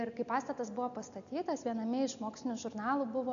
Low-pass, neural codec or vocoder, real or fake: 7.2 kHz; none; real